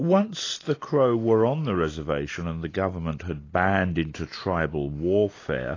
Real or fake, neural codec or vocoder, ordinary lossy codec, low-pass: real; none; AAC, 32 kbps; 7.2 kHz